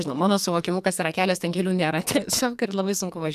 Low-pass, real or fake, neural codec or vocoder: 14.4 kHz; fake; codec, 44.1 kHz, 2.6 kbps, SNAC